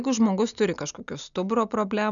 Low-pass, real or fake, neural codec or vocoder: 7.2 kHz; real; none